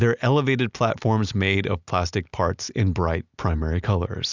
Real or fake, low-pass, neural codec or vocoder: real; 7.2 kHz; none